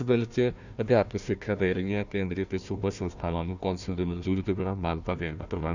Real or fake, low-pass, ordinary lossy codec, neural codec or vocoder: fake; 7.2 kHz; none; codec, 16 kHz, 1 kbps, FunCodec, trained on Chinese and English, 50 frames a second